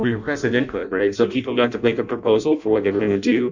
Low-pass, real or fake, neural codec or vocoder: 7.2 kHz; fake; codec, 16 kHz in and 24 kHz out, 0.6 kbps, FireRedTTS-2 codec